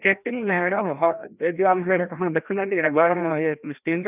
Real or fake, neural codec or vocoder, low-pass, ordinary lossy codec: fake; codec, 16 kHz, 1 kbps, FreqCodec, larger model; 3.6 kHz; none